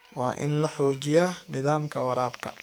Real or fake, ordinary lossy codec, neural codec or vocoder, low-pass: fake; none; codec, 44.1 kHz, 2.6 kbps, SNAC; none